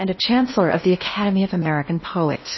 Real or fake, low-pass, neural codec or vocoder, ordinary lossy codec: fake; 7.2 kHz; codec, 16 kHz in and 24 kHz out, 0.8 kbps, FocalCodec, streaming, 65536 codes; MP3, 24 kbps